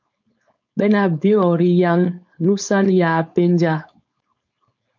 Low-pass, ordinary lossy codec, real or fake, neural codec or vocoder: 7.2 kHz; MP3, 48 kbps; fake; codec, 16 kHz, 4.8 kbps, FACodec